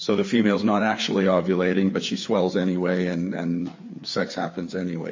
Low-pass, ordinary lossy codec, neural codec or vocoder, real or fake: 7.2 kHz; MP3, 32 kbps; codec, 16 kHz, 4 kbps, FreqCodec, larger model; fake